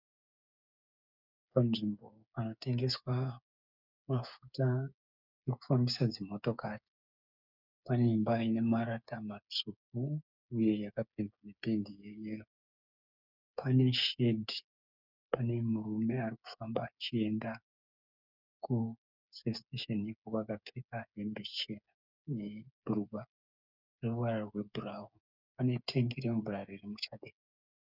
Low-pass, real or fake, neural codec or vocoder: 5.4 kHz; fake; codec, 16 kHz, 8 kbps, FreqCodec, smaller model